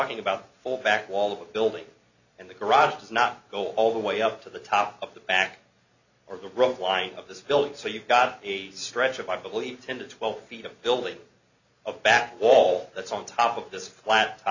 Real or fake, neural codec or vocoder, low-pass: real; none; 7.2 kHz